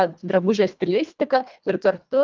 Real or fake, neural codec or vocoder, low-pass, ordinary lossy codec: fake; codec, 24 kHz, 1.5 kbps, HILCodec; 7.2 kHz; Opus, 24 kbps